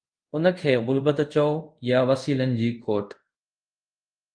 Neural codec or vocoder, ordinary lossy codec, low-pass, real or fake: codec, 24 kHz, 0.5 kbps, DualCodec; Opus, 32 kbps; 9.9 kHz; fake